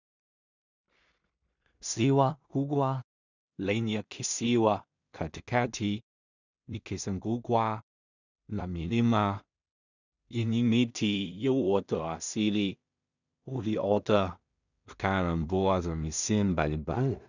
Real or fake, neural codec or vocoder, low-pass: fake; codec, 16 kHz in and 24 kHz out, 0.4 kbps, LongCat-Audio-Codec, two codebook decoder; 7.2 kHz